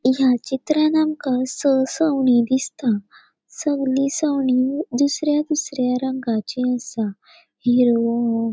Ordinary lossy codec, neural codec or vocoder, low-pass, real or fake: none; none; none; real